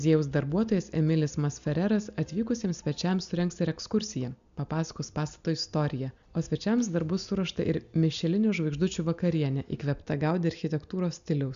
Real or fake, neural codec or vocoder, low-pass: real; none; 7.2 kHz